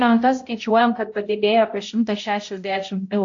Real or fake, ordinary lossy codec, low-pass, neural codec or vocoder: fake; AAC, 48 kbps; 7.2 kHz; codec, 16 kHz, 0.5 kbps, X-Codec, HuBERT features, trained on balanced general audio